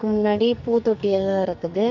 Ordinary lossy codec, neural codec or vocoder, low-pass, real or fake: none; codec, 44.1 kHz, 2.6 kbps, SNAC; 7.2 kHz; fake